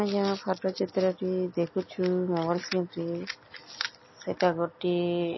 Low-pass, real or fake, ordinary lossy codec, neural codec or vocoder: 7.2 kHz; real; MP3, 24 kbps; none